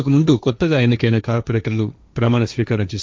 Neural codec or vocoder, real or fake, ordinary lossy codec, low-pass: codec, 16 kHz, 1.1 kbps, Voila-Tokenizer; fake; none; none